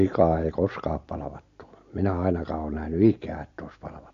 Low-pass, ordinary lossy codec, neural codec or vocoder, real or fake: 7.2 kHz; AAC, 48 kbps; none; real